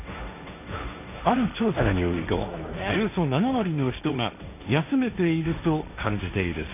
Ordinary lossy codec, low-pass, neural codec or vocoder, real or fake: none; 3.6 kHz; codec, 16 kHz, 1.1 kbps, Voila-Tokenizer; fake